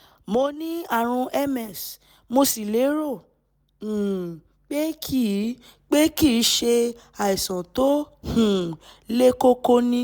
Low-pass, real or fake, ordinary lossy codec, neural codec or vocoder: none; real; none; none